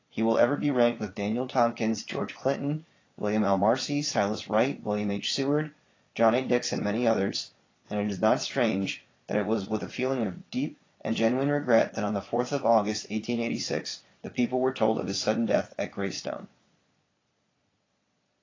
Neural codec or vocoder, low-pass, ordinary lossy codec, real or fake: vocoder, 22.05 kHz, 80 mel bands, Vocos; 7.2 kHz; AAC, 32 kbps; fake